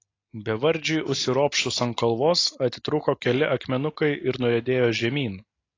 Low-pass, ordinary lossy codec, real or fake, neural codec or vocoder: 7.2 kHz; AAC, 32 kbps; real; none